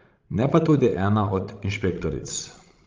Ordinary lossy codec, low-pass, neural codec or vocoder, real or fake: Opus, 32 kbps; 7.2 kHz; codec, 16 kHz, 16 kbps, FreqCodec, larger model; fake